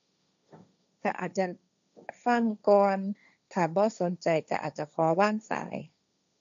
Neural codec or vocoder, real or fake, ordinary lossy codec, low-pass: codec, 16 kHz, 1.1 kbps, Voila-Tokenizer; fake; none; 7.2 kHz